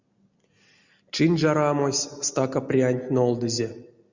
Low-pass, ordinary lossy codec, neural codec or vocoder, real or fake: 7.2 kHz; Opus, 64 kbps; none; real